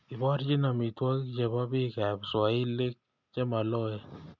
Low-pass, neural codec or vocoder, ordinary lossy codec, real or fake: 7.2 kHz; none; none; real